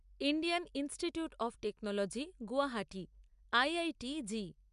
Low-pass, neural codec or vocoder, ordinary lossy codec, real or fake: 10.8 kHz; none; MP3, 96 kbps; real